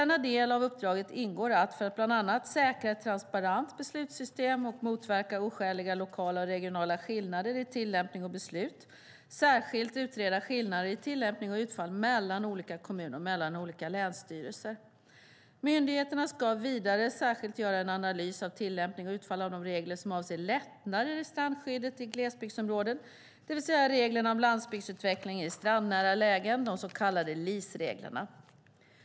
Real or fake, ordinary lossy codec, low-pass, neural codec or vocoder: real; none; none; none